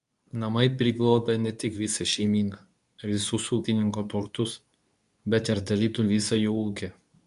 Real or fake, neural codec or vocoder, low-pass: fake; codec, 24 kHz, 0.9 kbps, WavTokenizer, medium speech release version 2; 10.8 kHz